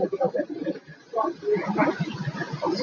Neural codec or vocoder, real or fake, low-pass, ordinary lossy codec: none; real; 7.2 kHz; AAC, 32 kbps